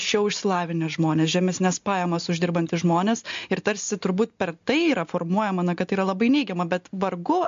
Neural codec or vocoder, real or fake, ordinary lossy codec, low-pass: none; real; MP3, 48 kbps; 7.2 kHz